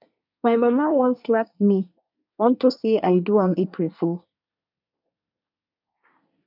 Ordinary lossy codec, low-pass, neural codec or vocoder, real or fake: none; 5.4 kHz; codec, 24 kHz, 1 kbps, SNAC; fake